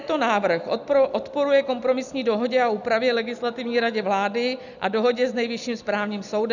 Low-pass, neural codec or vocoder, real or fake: 7.2 kHz; none; real